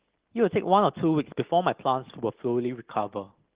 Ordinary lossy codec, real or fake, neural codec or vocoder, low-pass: Opus, 16 kbps; real; none; 3.6 kHz